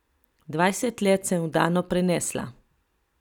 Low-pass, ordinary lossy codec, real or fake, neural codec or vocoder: 19.8 kHz; none; real; none